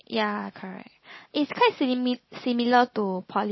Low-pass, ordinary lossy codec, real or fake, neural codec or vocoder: 7.2 kHz; MP3, 24 kbps; real; none